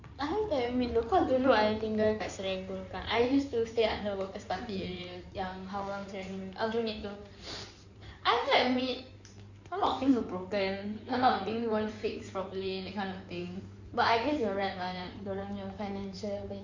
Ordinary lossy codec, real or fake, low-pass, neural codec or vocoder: none; fake; 7.2 kHz; codec, 16 kHz in and 24 kHz out, 2.2 kbps, FireRedTTS-2 codec